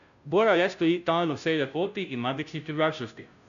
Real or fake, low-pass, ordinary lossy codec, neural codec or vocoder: fake; 7.2 kHz; none; codec, 16 kHz, 0.5 kbps, FunCodec, trained on Chinese and English, 25 frames a second